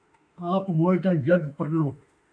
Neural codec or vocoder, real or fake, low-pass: autoencoder, 48 kHz, 32 numbers a frame, DAC-VAE, trained on Japanese speech; fake; 9.9 kHz